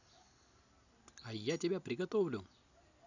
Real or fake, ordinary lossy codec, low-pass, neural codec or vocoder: real; none; 7.2 kHz; none